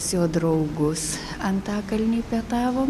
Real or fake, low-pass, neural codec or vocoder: real; 14.4 kHz; none